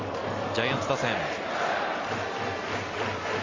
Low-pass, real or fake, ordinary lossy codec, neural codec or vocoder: 7.2 kHz; real; Opus, 32 kbps; none